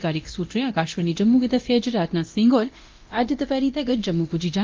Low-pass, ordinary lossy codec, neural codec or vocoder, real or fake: 7.2 kHz; Opus, 32 kbps; codec, 24 kHz, 0.9 kbps, DualCodec; fake